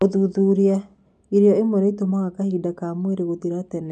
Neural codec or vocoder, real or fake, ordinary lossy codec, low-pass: none; real; none; none